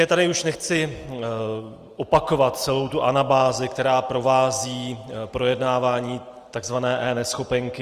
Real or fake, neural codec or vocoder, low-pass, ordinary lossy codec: real; none; 14.4 kHz; Opus, 24 kbps